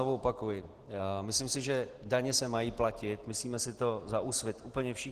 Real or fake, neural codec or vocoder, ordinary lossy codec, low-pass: real; none; Opus, 16 kbps; 14.4 kHz